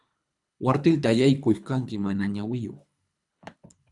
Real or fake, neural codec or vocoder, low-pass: fake; codec, 24 kHz, 3 kbps, HILCodec; 10.8 kHz